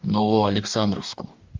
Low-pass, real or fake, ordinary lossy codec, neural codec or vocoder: 7.2 kHz; fake; Opus, 32 kbps; codec, 44.1 kHz, 2.6 kbps, DAC